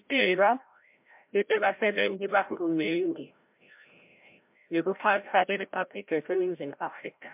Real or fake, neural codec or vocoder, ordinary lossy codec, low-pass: fake; codec, 16 kHz, 0.5 kbps, FreqCodec, larger model; MP3, 32 kbps; 3.6 kHz